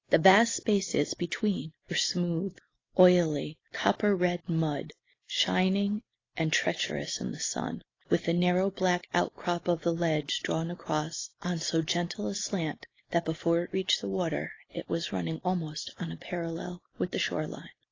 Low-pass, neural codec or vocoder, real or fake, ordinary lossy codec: 7.2 kHz; none; real; AAC, 32 kbps